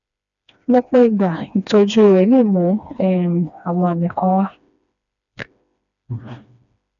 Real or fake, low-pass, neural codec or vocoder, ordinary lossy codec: fake; 7.2 kHz; codec, 16 kHz, 2 kbps, FreqCodec, smaller model; none